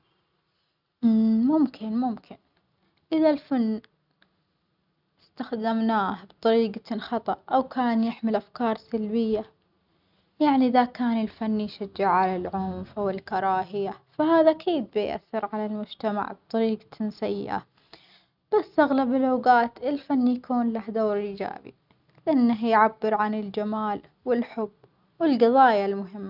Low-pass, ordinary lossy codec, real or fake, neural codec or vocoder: 5.4 kHz; none; real; none